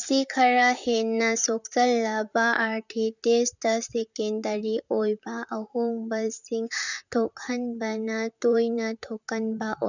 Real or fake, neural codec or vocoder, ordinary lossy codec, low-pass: fake; vocoder, 44.1 kHz, 128 mel bands, Pupu-Vocoder; none; 7.2 kHz